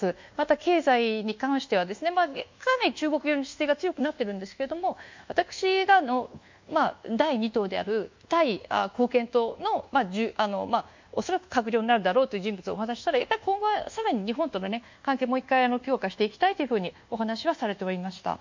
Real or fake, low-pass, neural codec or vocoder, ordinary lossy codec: fake; 7.2 kHz; codec, 24 kHz, 1.2 kbps, DualCodec; MP3, 64 kbps